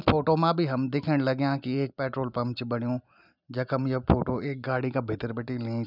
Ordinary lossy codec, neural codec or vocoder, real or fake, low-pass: none; none; real; 5.4 kHz